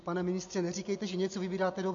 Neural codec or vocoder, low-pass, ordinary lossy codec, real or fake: none; 7.2 kHz; MP3, 48 kbps; real